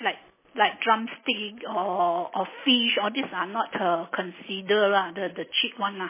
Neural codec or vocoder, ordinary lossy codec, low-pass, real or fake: none; MP3, 16 kbps; 3.6 kHz; real